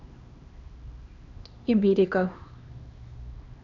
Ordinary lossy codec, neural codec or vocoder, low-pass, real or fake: AAC, 48 kbps; codec, 16 kHz, 2 kbps, X-Codec, HuBERT features, trained on LibriSpeech; 7.2 kHz; fake